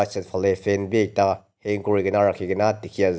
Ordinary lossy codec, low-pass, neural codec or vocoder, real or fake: none; none; none; real